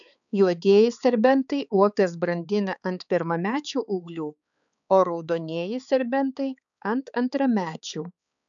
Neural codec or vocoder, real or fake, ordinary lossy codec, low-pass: codec, 16 kHz, 4 kbps, X-Codec, HuBERT features, trained on balanced general audio; fake; MP3, 96 kbps; 7.2 kHz